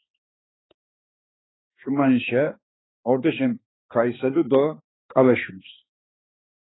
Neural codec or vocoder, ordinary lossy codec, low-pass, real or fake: codec, 16 kHz, 2 kbps, X-Codec, HuBERT features, trained on balanced general audio; AAC, 16 kbps; 7.2 kHz; fake